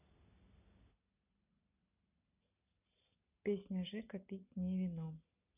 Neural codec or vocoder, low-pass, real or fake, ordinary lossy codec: none; 3.6 kHz; real; none